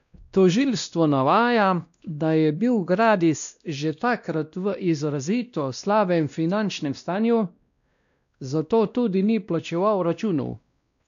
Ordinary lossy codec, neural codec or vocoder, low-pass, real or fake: none; codec, 16 kHz, 1 kbps, X-Codec, WavLM features, trained on Multilingual LibriSpeech; 7.2 kHz; fake